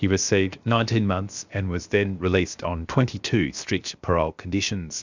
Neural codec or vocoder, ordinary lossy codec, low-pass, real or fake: codec, 16 kHz, 0.7 kbps, FocalCodec; Opus, 64 kbps; 7.2 kHz; fake